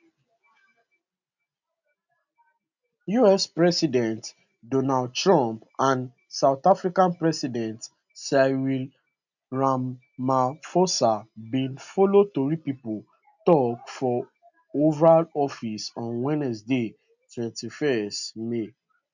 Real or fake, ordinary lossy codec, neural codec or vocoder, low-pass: real; none; none; 7.2 kHz